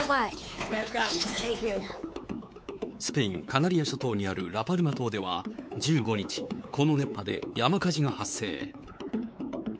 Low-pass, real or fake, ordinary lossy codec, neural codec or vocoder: none; fake; none; codec, 16 kHz, 4 kbps, X-Codec, WavLM features, trained on Multilingual LibriSpeech